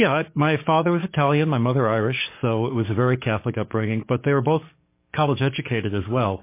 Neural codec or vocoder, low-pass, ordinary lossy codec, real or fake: codec, 44.1 kHz, 7.8 kbps, DAC; 3.6 kHz; MP3, 24 kbps; fake